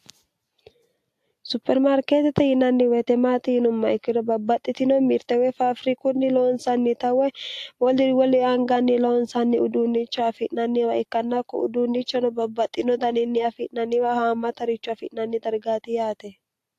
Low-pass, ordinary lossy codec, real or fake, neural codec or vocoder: 14.4 kHz; AAC, 64 kbps; real; none